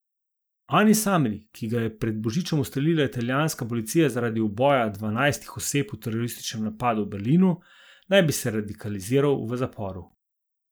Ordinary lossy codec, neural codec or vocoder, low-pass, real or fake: none; none; none; real